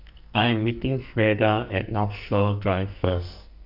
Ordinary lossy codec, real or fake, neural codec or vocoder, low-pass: none; fake; codec, 44.1 kHz, 2.6 kbps, SNAC; 5.4 kHz